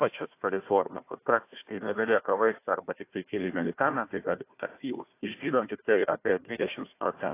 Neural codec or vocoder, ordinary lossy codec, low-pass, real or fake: codec, 16 kHz, 1 kbps, FunCodec, trained on Chinese and English, 50 frames a second; AAC, 24 kbps; 3.6 kHz; fake